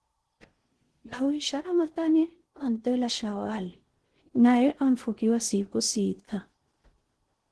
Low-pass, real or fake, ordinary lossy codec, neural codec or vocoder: 10.8 kHz; fake; Opus, 16 kbps; codec, 16 kHz in and 24 kHz out, 0.6 kbps, FocalCodec, streaming, 4096 codes